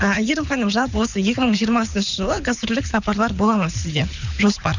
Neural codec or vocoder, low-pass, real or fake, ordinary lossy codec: codec, 24 kHz, 6 kbps, HILCodec; 7.2 kHz; fake; none